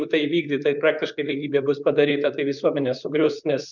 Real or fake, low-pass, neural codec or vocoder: fake; 7.2 kHz; vocoder, 44.1 kHz, 128 mel bands, Pupu-Vocoder